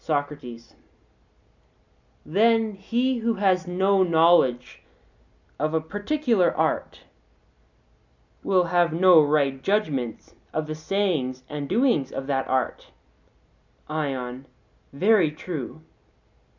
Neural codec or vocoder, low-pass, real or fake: none; 7.2 kHz; real